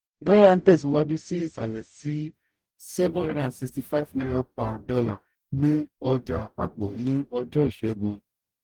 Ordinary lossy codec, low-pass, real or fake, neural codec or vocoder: Opus, 24 kbps; 19.8 kHz; fake; codec, 44.1 kHz, 0.9 kbps, DAC